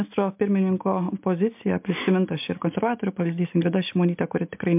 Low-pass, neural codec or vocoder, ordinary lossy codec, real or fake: 3.6 kHz; none; MP3, 32 kbps; real